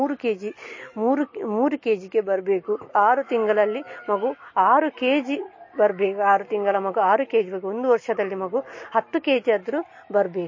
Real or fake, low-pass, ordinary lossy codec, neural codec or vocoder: real; 7.2 kHz; MP3, 32 kbps; none